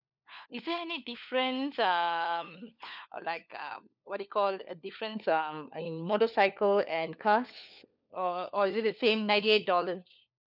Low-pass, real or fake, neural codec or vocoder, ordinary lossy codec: 5.4 kHz; fake; codec, 16 kHz, 4 kbps, FunCodec, trained on LibriTTS, 50 frames a second; none